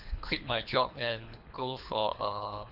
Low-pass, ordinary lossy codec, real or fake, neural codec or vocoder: 5.4 kHz; none; fake; codec, 24 kHz, 3 kbps, HILCodec